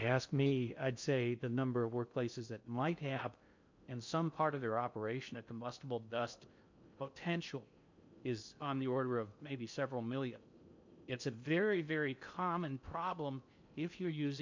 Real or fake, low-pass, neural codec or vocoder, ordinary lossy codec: fake; 7.2 kHz; codec, 16 kHz in and 24 kHz out, 0.6 kbps, FocalCodec, streaming, 2048 codes; MP3, 64 kbps